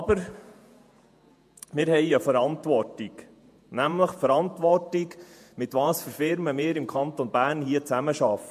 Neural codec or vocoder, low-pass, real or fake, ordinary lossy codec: vocoder, 48 kHz, 128 mel bands, Vocos; 14.4 kHz; fake; MP3, 64 kbps